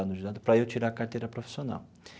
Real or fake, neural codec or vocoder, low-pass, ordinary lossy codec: real; none; none; none